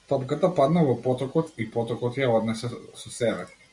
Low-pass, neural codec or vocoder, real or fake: 10.8 kHz; none; real